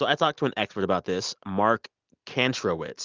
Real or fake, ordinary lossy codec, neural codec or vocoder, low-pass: real; Opus, 24 kbps; none; 7.2 kHz